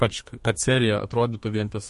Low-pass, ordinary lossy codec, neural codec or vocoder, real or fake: 14.4 kHz; MP3, 48 kbps; codec, 32 kHz, 1.9 kbps, SNAC; fake